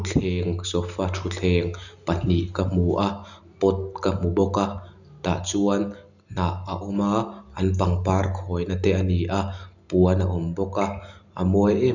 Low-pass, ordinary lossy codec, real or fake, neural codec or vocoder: 7.2 kHz; none; real; none